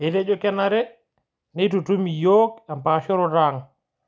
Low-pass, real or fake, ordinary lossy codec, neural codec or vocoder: none; real; none; none